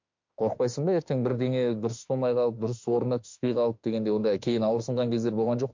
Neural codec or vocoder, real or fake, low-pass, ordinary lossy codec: autoencoder, 48 kHz, 32 numbers a frame, DAC-VAE, trained on Japanese speech; fake; 7.2 kHz; MP3, 48 kbps